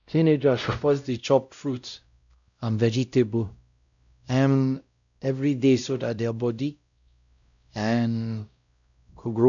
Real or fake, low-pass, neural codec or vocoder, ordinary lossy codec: fake; 7.2 kHz; codec, 16 kHz, 0.5 kbps, X-Codec, WavLM features, trained on Multilingual LibriSpeech; none